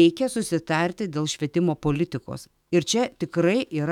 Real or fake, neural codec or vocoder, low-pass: fake; autoencoder, 48 kHz, 128 numbers a frame, DAC-VAE, trained on Japanese speech; 19.8 kHz